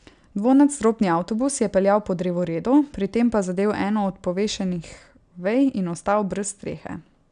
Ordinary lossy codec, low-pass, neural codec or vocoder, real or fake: none; 9.9 kHz; none; real